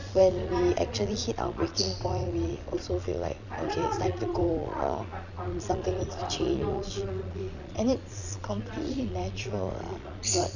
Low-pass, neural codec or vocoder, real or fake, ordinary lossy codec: 7.2 kHz; vocoder, 22.05 kHz, 80 mel bands, Vocos; fake; none